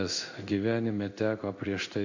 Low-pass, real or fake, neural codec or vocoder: 7.2 kHz; fake; codec, 16 kHz in and 24 kHz out, 1 kbps, XY-Tokenizer